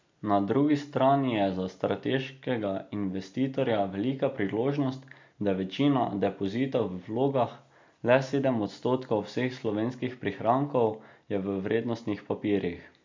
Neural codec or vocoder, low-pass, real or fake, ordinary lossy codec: none; 7.2 kHz; real; MP3, 48 kbps